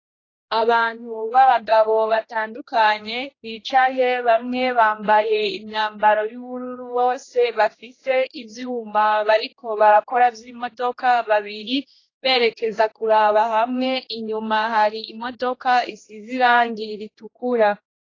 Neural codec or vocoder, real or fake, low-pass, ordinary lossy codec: codec, 16 kHz, 1 kbps, X-Codec, HuBERT features, trained on general audio; fake; 7.2 kHz; AAC, 32 kbps